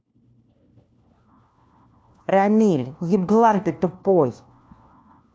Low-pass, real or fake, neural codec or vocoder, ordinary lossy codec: none; fake; codec, 16 kHz, 1 kbps, FunCodec, trained on LibriTTS, 50 frames a second; none